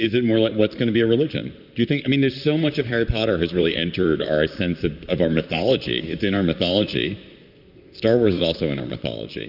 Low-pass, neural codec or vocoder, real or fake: 5.4 kHz; vocoder, 44.1 kHz, 128 mel bands, Pupu-Vocoder; fake